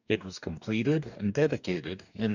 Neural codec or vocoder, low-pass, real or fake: codec, 44.1 kHz, 2.6 kbps, DAC; 7.2 kHz; fake